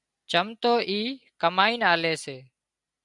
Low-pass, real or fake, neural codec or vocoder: 10.8 kHz; real; none